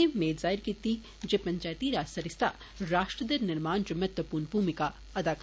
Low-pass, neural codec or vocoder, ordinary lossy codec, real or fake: none; none; none; real